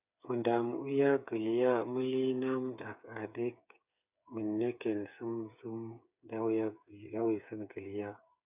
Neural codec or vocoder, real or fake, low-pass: codec, 16 kHz, 8 kbps, FreqCodec, smaller model; fake; 3.6 kHz